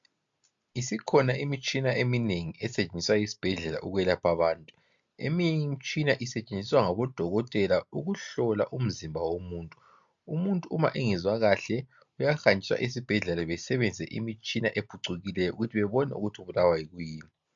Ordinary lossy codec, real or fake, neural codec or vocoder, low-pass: MP3, 64 kbps; real; none; 7.2 kHz